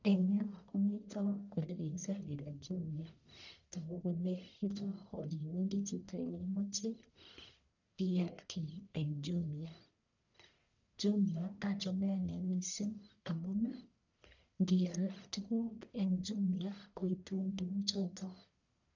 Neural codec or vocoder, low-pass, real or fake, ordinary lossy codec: codec, 44.1 kHz, 1.7 kbps, Pupu-Codec; 7.2 kHz; fake; none